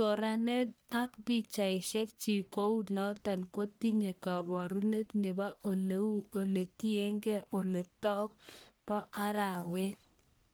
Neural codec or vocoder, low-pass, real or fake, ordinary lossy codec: codec, 44.1 kHz, 1.7 kbps, Pupu-Codec; none; fake; none